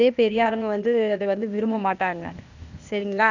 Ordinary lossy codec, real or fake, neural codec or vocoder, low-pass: none; fake; codec, 16 kHz, 0.8 kbps, ZipCodec; 7.2 kHz